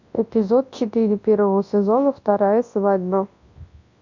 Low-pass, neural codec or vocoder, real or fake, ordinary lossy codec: 7.2 kHz; codec, 24 kHz, 0.9 kbps, WavTokenizer, large speech release; fake; AAC, 48 kbps